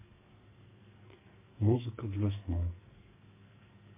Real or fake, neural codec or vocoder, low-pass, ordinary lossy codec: fake; codec, 44.1 kHz, 2.6 kbps, SNAC; 3.6 kHz; MP3, 24 kbps